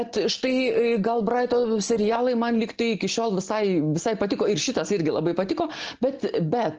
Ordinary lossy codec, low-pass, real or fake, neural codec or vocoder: Opus, 16 kbps; 7.2 kHz; real; none